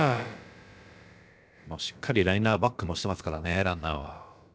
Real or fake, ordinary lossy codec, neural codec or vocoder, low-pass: fake; none; codec, 16 kHz, about 1 kbps, DyCAST, with the encoder's durations; none